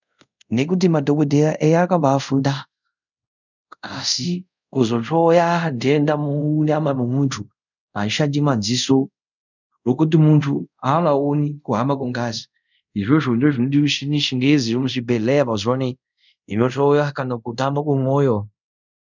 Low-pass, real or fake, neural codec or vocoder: 7.2 kHz; fake; codec, 24 kHz, 0.5 kbps, DualCodec